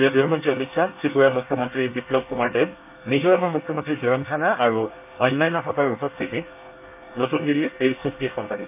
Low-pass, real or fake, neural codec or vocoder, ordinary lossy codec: 3.6 kHz; fake; codec, 24 kHz, 1 kbps, SNAC; none